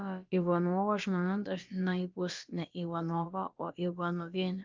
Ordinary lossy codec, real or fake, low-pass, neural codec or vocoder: Opus, 24 kbps; fake; 7.2 kHz; codec, 16 kHz, about 1 kbps, DyCAST, with the encoder's durations